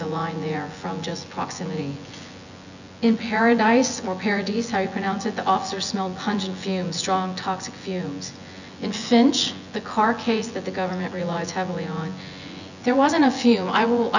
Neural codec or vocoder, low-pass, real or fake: vocoder, 24 kHz, 100 mel bands, Vocos; 7.2 kHz; fake